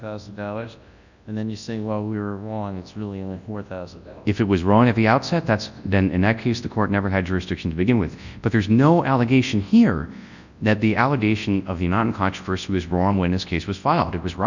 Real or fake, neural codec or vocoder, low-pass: fake; codec, 24 kHz, 0.9 kbps, WavTokenizer, large speech release; 7.2 kHz